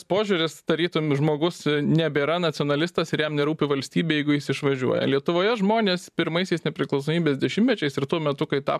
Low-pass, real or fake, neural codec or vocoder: 14.4 kHz; fake; vocoder, 44.1 kHz, 128 mel bands every 512 samples, BigVGAN v2